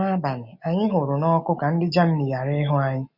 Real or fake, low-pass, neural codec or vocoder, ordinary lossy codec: real; 5.4 kHz; none; none